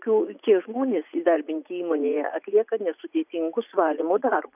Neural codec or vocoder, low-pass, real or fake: vocoder, 44.1 kHz, 80 mel bands, Vocos; 3.6 kHz; fake